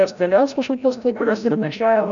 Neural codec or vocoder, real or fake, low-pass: codec, 16 kHz, 0.5 kbps, FreqCodec, larger model; fake; 7.2 kHz